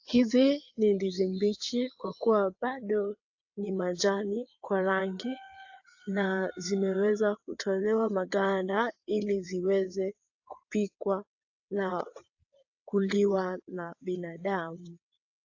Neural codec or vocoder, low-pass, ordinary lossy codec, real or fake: vocoder, 22.05 kHz, 80 mel bands, WaveNeXt; 7.2 kHz; AAC, 48 kbps; fake